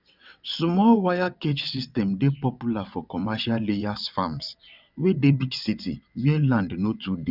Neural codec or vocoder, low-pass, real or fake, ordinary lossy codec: vocoder, 22.05 kHz, 80 mel bands, WaveNeXt; 5.4 kHz; fake; none